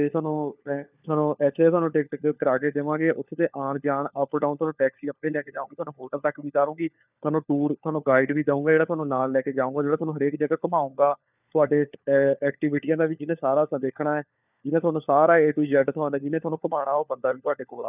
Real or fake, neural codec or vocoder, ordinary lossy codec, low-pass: fake; codec, 16 kHz, 4 kbps, FunCodec, trained on LibriTTS, 50 frames a second; none; 3.6 kHz